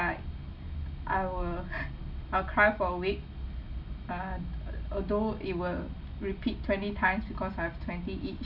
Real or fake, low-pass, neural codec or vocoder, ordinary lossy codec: real; 5.4 kHz; none; none